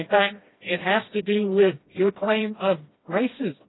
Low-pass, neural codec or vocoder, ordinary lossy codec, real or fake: 7.2 kHz; codec, 16 kHz, 1 kbps, FreqCodec, smaller model; AAC, 16 kbps; fake